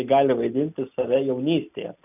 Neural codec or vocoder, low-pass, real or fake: none; 3.6 kHz; real